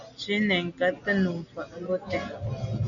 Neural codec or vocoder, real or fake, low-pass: none; real; 7.2 kHz